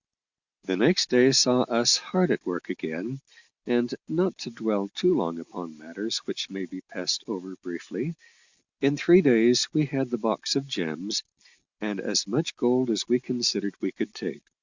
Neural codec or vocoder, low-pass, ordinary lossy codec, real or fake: none; 7.2 kHz; Opus, 64 kbps; real